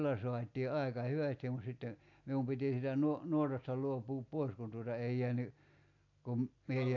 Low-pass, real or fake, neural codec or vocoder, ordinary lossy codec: 7.2 kHz; real; none; AAC, 48 kbps